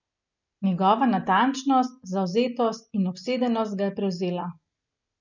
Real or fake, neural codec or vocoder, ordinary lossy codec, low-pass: real; none; none; 7.2 kHz